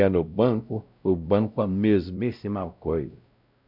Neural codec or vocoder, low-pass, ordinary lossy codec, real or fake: codec, 16 kHz, 0.5 kbps, X-Codec, WavLM features, trained on Multilingual LibriSpeech; 5.4 kHz; none; fake